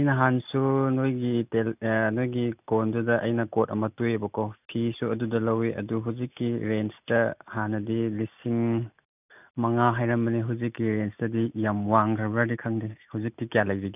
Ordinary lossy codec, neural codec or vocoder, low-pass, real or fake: none; none; 3.6 kHz; real